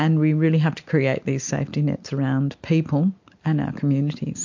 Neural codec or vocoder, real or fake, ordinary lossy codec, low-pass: none; real; MP3, 48 kbps; 7.2 kHz